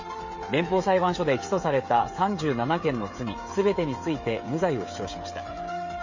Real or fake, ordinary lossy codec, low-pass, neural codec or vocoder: fake; MP3, 32 kbps; 7.2 kHz; codec, 16 kHz, 16 kbps, FreqCodec, smaller model